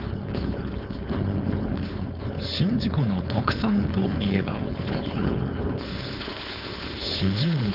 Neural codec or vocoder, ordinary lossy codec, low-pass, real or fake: codec, 16 kHz, 4.8 kbps, FACodec; none; 5.4 kHz; fake